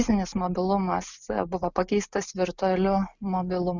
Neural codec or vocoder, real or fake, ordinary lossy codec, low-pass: none; real; Opus, 64 kbps; 7.2 kHz